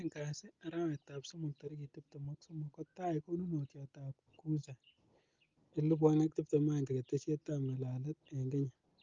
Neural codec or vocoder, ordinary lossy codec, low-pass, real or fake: none; Opus, 32 kbps; 7.2 kHz; real